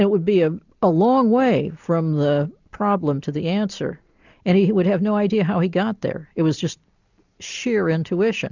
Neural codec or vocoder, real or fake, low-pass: none; real; 7.2 kHz